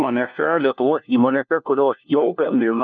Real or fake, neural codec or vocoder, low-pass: fake; codec, 16 kHz, 1 kbps, FunCodec, trained on LibriTTS, 50 frames a second; 7.2 kHz